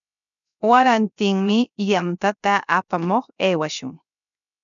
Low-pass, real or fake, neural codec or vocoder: 7.2 kHz; fake; codec, 16 kHz, 0.7 kbps, FocalCodec